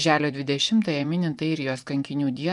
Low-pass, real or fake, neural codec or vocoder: 10.8 kHz; fake; vocoder, 24 kHz, 100 mel bands, Vocos